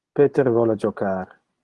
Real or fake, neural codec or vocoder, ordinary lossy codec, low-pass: real; none; Opus, 24 kbps; 10.8 kHz